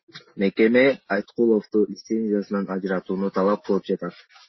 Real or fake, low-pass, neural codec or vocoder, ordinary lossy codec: real; 7.2 kHz; none; MP3, 24 kbps